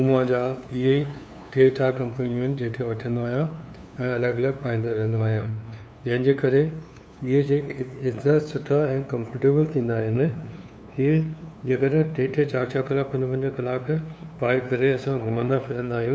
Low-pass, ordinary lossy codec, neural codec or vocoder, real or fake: none; none; codec, 16 kHz, 2 kbps, FunCodec, trained on LibriTTS, 25 frames a second; fake